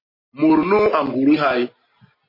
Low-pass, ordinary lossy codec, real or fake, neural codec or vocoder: 5.4 kHz; MP3, 24 kbps; real; none